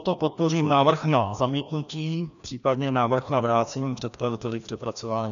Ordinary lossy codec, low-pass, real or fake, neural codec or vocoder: MP3, 96 kbps; 7.2 kHz; fake; codec, 16 kHz, 1 kbps, FreqCodec, larger model